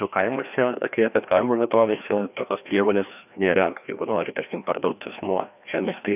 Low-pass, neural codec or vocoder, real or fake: 3.6 kHz; codec, 16 kHz, 1 kbps, FreqCodec, larger model; fake